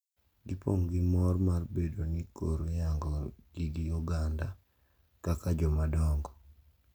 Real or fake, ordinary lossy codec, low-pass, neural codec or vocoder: real; none; none; none